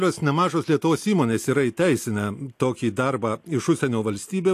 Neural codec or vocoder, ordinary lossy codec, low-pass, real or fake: none; AAC, 64 kbps; 14.4 kHz; real